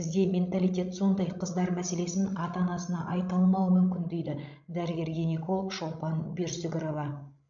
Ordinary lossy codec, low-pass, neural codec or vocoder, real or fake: MP3, 64 kbps; 7.2 kHz; codec, 16 kHz, 16 kbps, FreqCodec, larger model; fake